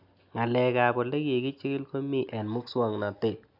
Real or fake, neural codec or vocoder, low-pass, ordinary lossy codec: real; none; 5.4 kHz; none